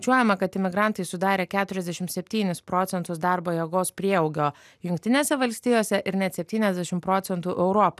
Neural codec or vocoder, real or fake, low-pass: none; real; 14.4 kHz